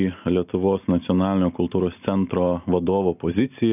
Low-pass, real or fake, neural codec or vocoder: 3.6 kHz; real; none